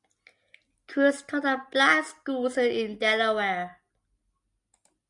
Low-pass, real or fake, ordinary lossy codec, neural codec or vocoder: 10.8 kHz; real; MP3, 96 kbps; none